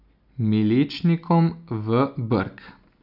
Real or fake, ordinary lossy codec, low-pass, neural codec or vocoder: real; none; 5.4 kHz; none